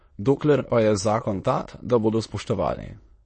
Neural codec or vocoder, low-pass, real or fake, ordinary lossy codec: autoencoder, 22.05 kHz, a latent of 192 numbers a frame, VITS, trained on many speakers; 9.9 kHz; fake; MP3, 32 kbps